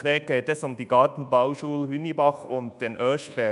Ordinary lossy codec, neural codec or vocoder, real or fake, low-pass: none; codec, 24 kHz, 1.2 kbps, DualCodec; fake; 10.8 kHz